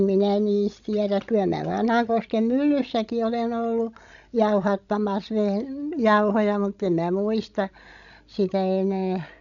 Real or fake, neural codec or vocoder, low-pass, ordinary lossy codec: fake; codec, 16 kHz, 16 kbps, FreqCodec, larger model; 7.2 kHz; none